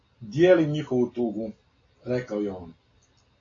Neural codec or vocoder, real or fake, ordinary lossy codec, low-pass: none; real; AAC, 32 kbps; 7.2 kHz